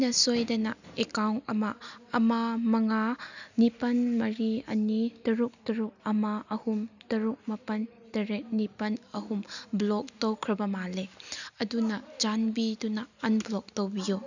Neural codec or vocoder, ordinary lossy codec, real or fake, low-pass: none; none; real; 7.2 kHz